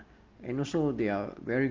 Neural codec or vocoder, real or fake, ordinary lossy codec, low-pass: none; real; Opus, 24 kbps; 7.2 kHz